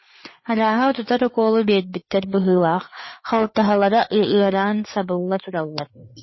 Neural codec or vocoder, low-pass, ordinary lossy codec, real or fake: codec, 16 kHz, 4 kbps, FreqCodec, larger model; 7.2 kHz; MP3, 24 kbps; fake